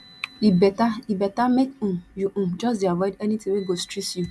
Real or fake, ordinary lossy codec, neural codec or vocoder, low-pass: real; none; none; none